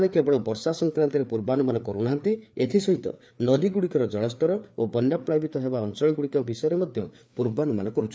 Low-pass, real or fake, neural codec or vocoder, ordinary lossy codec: none; fake; codec, 16 kHz, 4 kbps, FreqCodec, larger model; none